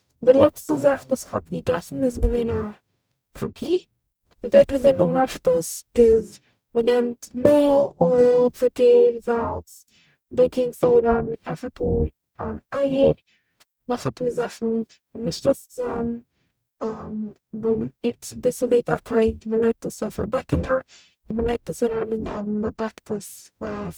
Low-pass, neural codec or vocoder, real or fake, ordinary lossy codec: none; codec, 44.1 kHz, 0.9 kbps, DAC; fake; none